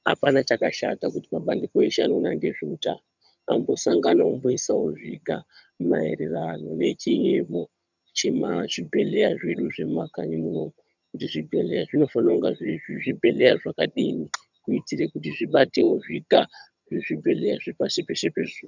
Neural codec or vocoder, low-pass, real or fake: vocoder, 22.05 kHz, 80 mel bands, HiFi-GAN; 7.2 kHz; fake